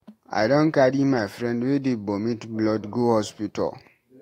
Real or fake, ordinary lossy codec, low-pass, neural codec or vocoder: fake; AAC, 48 kbps; 19.8 kHz; autoencoder, 48 kHz, 128 numbers a frame, DAC-VAE, trained on Japanese speech